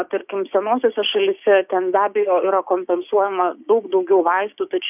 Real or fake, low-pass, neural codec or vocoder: fake; 3.6 kHz; codec, 16 kHz, 6 kbps, DAC